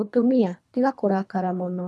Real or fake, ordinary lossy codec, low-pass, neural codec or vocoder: fake; none; none; codec, 24 kHz, 3 kbps, HILCodec